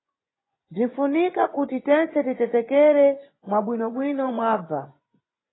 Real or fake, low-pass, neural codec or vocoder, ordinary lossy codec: fake; 7.2 kHz; vocoder, 24 kHz, 100 mel bands, Vocos; AAC, 16 kbps